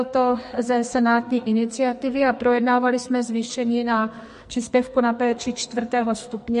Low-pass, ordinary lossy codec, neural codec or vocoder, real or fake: 14.4 kHz; MP3, 48 kbps; codec, 44.1 kHz, 2.6 kbps, SNAC; fake